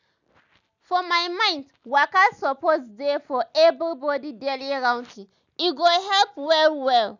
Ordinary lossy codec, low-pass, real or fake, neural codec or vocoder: none; 7.2 kHz; real; none